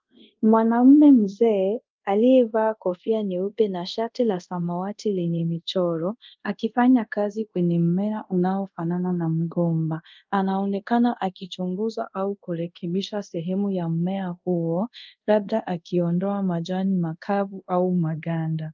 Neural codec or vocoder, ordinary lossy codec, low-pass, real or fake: codec, 24 kHz, 0.5 kbps, DualCodec; Opus, 32 kbps; 7.2 kHz; fake